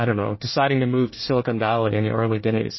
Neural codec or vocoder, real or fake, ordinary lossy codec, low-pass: codec, 24 kHz, 1 kbps, SNAC; fake; MP3, 24 kbps; 7.2 kHz